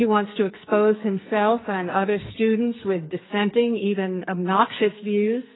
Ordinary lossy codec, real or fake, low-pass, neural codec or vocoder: AAC, 16 kbps; fake; 7.2 kHz; codec, 44.1 kHz, 2.6 kbps, SNAC